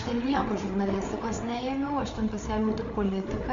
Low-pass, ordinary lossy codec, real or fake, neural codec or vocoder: 7.2 kHz; MP3, 48 kbps; fake; codec, 16 kHz, 8 kbps, FreqCodec, larger model